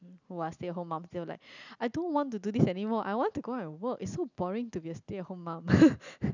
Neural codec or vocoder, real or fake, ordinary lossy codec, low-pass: none; real; none; 7.2 kHz